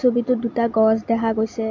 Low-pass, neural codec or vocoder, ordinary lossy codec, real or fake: 7.2 kHz; none; MP3, 48 kbps; real